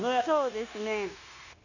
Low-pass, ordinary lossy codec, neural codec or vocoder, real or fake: 7.2 kHz; none; codec, 16 kHz, 0.9 kbps, LongCat-Audio-Codec; fake